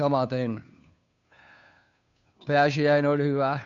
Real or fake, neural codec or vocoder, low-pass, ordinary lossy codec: fake; codec, 16 kHz, 2 kbps, FunCodec, trained on Chinese and English, 25 frames a second; 7.2 kHz; none